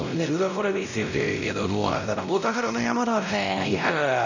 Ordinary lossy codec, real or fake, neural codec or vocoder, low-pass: none; fake; codec, 16 kHz, 0.5 kbps, X-Codec, WavLM features, trained on Multilingual LibriSpeech; 7.2 kHz